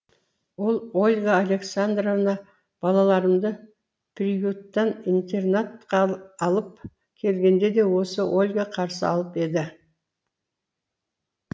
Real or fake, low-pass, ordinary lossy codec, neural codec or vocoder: real; none; none; none